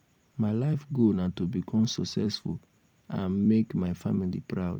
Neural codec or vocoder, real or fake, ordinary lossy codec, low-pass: vocoder, 44.1 kHz, 128 mel bands every 256 samples, BigVGAN v2; fake; none; 19.8 kHz